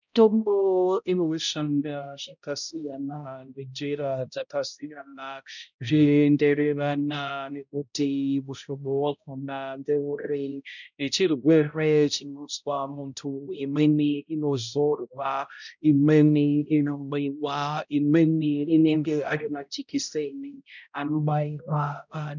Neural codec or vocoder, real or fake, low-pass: codec, 16 kHz, 0.5 kbps, X-Codec, HuBERT features, trained on balanced general audio; fake; 7.2 kHz